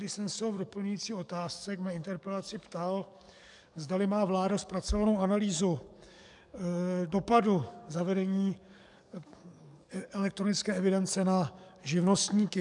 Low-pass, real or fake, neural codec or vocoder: 10.8 kHz; fake; codec, 44.1 kHz, 7.8 kbps, DAC